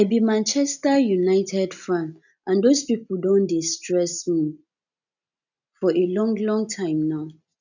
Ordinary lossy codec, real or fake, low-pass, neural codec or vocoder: none; real; 7.2 kHz; none